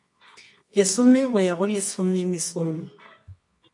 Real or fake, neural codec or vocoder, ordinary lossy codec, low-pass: fake; codec, 24 kHz, 0.9 kbps, WavTokenizer, medium music audio release; MP3, 48 kbps; 10.8 kHz